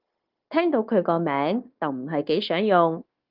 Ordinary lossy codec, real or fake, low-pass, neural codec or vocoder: Opus, 32 kbps; fake; 5.4 kHz; codec, 16 kHz, 0.9 kbps, LongCat-Audio-Codec